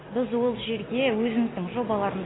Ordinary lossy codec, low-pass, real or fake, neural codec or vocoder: AAC, 16 kbps; 7.2 kHz; real; none